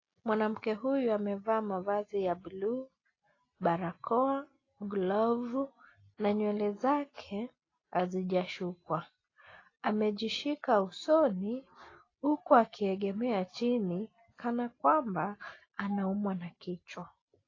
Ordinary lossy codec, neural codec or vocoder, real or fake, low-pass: AAC, 32 kbps; none; real; 7.2 kHz